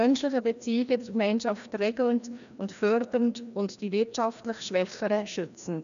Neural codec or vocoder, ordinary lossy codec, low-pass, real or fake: codec, 16 kHz, 1 kbps, FreqCodec, larger model; none; 7.2 kHz; fake